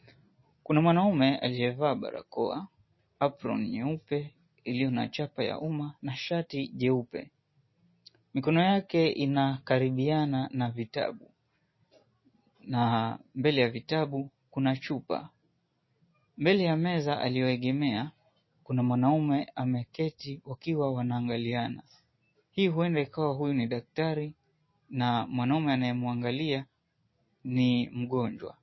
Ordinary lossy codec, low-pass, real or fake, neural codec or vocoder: MP3, 24 kbps; 7.2 kHz; real; none